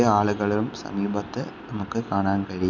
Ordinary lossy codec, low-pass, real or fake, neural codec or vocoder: none; 7.2 kHz; real; none